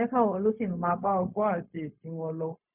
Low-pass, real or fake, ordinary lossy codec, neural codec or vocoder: 3.6 kHz; fake; none; codec, 16 kHz, 0.4 kbps, LongCat-Audio-Codec